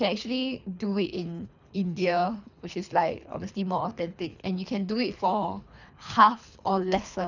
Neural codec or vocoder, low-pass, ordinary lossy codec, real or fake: codec, 24 kHz, 3 kbps, HILCodec; 7.2 kHz; none; fake